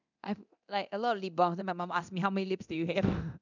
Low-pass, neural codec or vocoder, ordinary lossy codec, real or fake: 7.2 kHz; codec, 24 kHz, 0.9 kbps, DualCodec; none; fake